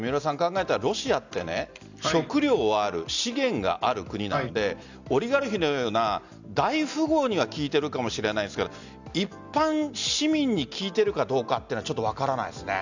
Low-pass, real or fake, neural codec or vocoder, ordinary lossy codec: 7.2 kHz; real; none; none